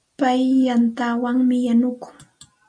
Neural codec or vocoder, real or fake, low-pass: none; real; 9.9 kHz